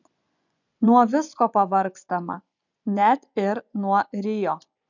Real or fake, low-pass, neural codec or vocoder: fake; 7.2 kHz; vocoder, 24 kHz, 100 mel bands, Vocos